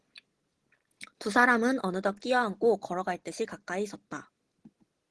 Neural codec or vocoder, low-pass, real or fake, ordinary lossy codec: none; 10.8 kHz; real; Opus, 16 kbps